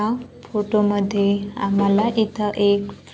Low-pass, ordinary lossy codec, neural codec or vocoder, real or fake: none; none; none; real